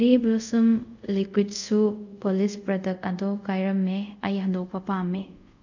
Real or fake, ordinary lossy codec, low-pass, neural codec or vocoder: fake; none; 7.2 kHz; codec, 24 kHz, 0.5 kbps, DualCodec